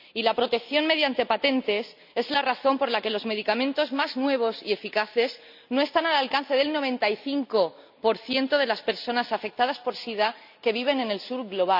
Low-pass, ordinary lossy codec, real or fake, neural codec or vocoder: 5.4 kHz; none; real; none